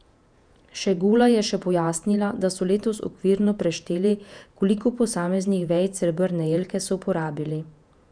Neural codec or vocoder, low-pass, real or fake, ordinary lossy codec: vocoder, 48 kHz, 128 mel bands, Vocos; 9.9 kHz; fake; none